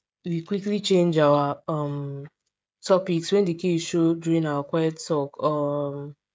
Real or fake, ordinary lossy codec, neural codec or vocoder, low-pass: fake; none; codec, 16 kHz, 16 kbps, FreqCodec, smaller model; none